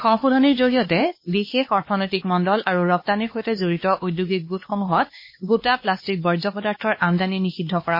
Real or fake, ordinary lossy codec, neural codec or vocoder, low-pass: fake; MP3, 24 kbps; codec, 16 kHz, 2 kbps, X-Codec, HuBERT features, trained on LibriSpeech; 5.4 kHz